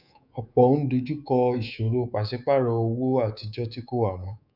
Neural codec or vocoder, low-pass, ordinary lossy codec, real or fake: codec, 24 kHz, 3.1 kbps, DualCodec; 5.4 kHz; none; fake